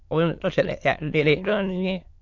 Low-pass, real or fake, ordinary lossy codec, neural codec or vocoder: 7.2 kHz; fake; AAC, 48 kbps; autoencoder, 22.05 kHz, a latent of 192 numbers a frame, VITS, trained on many speakers